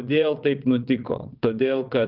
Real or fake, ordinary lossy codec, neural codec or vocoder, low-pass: fake; Opus, 32 kbps; vocoder, 22.05 kHz, 80 mel bands, WaveNeXt; 5.4 kHz